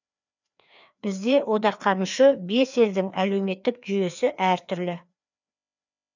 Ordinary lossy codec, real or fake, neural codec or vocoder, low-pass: none; fake; codec, 16 kHz, 2 kbps, FreqCodec, larger model; 7.2 kHz